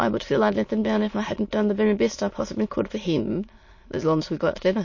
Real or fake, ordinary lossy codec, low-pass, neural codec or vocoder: fake; MP3, 32 kbps; 7.2 kHz; autoencoder, 22.05 kHz, a latent of 192 numbers a frame, VITS, trained on many speakers